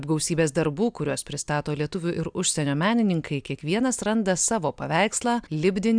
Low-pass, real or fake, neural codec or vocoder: 9.9 kHz; real; none